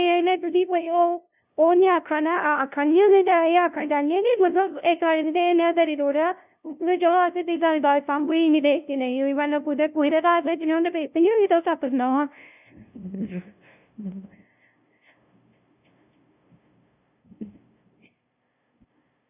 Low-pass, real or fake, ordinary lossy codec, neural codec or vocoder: 3.6 kHz; fake; none; codec, 16 kHz, 0.5 kbps, FunCodec, trained on LibriTTS, 25 frames a second